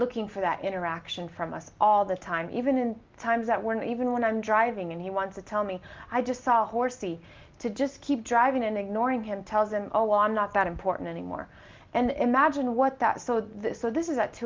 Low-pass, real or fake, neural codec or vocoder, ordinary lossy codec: 7.2 kHz; real; none; Opus, 32 kbps